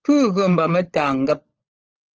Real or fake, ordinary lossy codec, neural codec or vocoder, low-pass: fake; Opus, 16 kbps; codec, 16 kHz, 16 kbps, FunCodec, trained on LibriTTS, 50 frames a second; 7.2 kHz